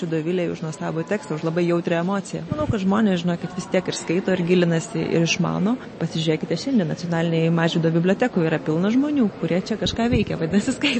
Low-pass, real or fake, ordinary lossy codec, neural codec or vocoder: 9.9 kHz; real; MP3, 32 kbps; none